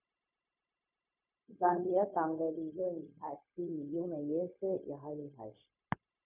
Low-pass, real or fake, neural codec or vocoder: 3.6 kHz; fake; codec, 16 kHz, 0.4 kbps, LongCat-Audio-Codec